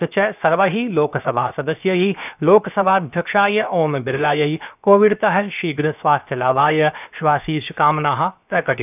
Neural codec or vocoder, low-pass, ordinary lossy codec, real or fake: codec, 16 kHz, 0.7 kbps, FocalCodec; 3.6 kHz; none; fake